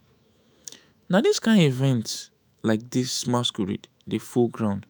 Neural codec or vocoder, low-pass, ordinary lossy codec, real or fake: autoencoder, 48 kHz, 128 numbers a frame, DAC-VAE, trained on Japanese speech; none; none; fake